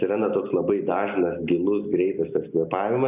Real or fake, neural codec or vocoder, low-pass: real; none; 3.6 kHz